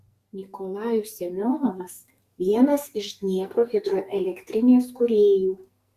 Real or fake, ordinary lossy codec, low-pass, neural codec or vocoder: fake; Opus, 64 kbps; 14.4 kHz; codec, 44.1 kHz, 2.6 kbps, SNAC